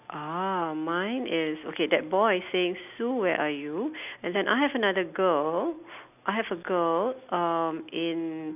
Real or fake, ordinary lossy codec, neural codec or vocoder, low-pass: real; none; none; 3.6 kHz